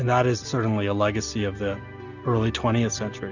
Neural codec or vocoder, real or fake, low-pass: none; real; 7.2 kHz